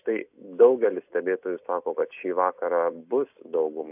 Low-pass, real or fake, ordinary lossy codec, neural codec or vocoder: 3.6 kHz; real; AAC, 32 kbps; none